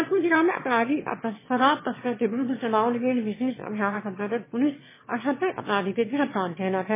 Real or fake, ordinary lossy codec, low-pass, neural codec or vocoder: fake; MP3, 16 kbps; 3.6 kHz; autoencoder, 22.05 kHz, a latent of 192 numbers a frame, VITS, trained on one speaker